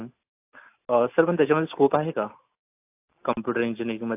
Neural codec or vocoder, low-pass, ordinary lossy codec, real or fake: none; 3.6 kHz; AAC, 32 kbps; real